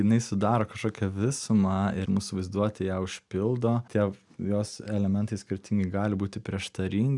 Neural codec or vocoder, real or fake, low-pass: none; real; 10.8 kHz